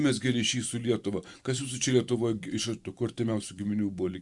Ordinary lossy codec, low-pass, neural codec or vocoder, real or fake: Opus, 64 kbps; 10.8 kHz; vocoder, 44.1 kHz, 128 mel bands every 512 samples, BigVGAN v2; fake